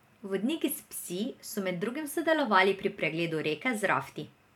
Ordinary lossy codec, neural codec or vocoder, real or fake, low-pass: none; vocoder, 44.1 kHz, 128 mel bands every 512 samples, BigVGAN v2; fake; 19.8 kHz